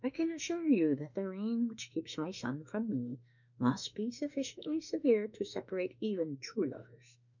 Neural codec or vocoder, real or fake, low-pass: autoencoder, 48 kHz, 32 numbers a frame, DAC-VAE, trained on Japanese speech; fake; 7.2 kHz